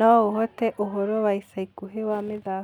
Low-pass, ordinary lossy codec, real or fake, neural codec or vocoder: 19.8 kHz; none; real; none